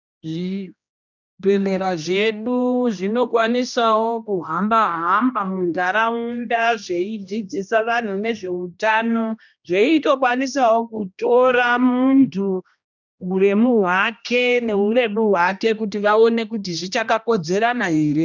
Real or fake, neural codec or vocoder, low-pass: fake; codec, 16 kHz, 1 kbps, X-Codec, HuBERT features, trained on general audio; 7.2 kHz